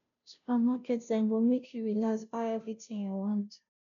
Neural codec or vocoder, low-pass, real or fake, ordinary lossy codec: codec, 16 kHz, 0.5 kbps, FunCodec, trained on Chinese and English, 25 frames a second; 7.2 kHz; fake; none